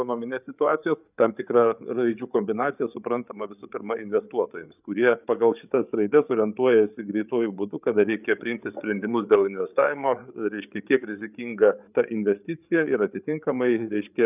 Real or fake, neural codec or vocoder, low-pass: fake; codec, 16 kHz, 8 kbps, FreqCodec, larger model; 3.6 kHz